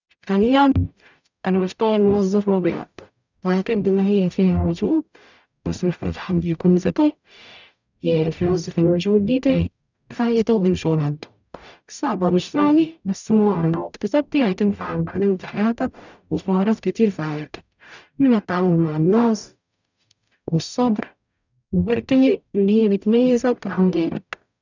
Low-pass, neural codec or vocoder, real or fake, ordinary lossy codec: 7.2 kHz; codec, 44.1 kHz, 0.9 kbps, DAC; fake; none